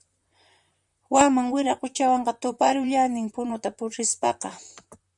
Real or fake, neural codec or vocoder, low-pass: fake; vocoder, 44.1 kHz, 128 mel bands, Pupu-Vocoder; 10.8 kHz